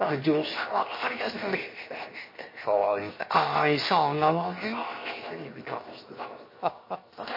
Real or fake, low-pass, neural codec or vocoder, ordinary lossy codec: fake; 5.4 kHz; codec, 16 kHz, 0.7 kbps, FocalCodec; MP3, 24 kbps